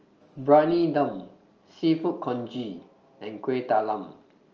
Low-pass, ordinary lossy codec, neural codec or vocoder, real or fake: 7.2 kHz; Opus, 24 kbps; none; real